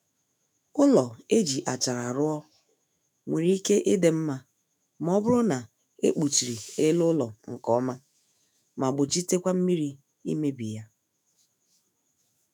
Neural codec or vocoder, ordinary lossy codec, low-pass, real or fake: autoencoder, 48 kHz, 128 numbers a frame, DAC-VAE, trained on Japanese speech; none; none; fake